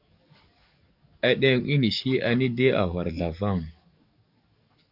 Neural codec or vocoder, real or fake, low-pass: codec, 44.1 kHz, 7.8 kbps, DAC; fake; 5.4 kHz